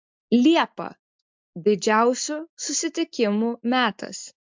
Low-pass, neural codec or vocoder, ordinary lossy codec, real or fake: 7.2 kHz; none; MP3, 64 kbps; real